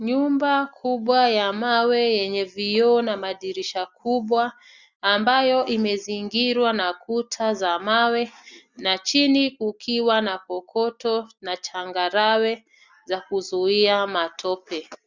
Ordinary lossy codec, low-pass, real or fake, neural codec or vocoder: Opus, 64 kbps; 7.2 kHz; real; none